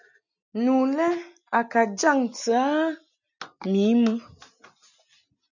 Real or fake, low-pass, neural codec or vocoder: real; 7.2 kHz; none